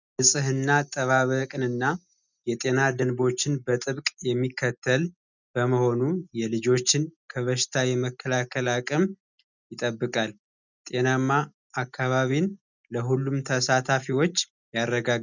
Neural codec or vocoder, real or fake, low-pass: none; real; 7.2 kHz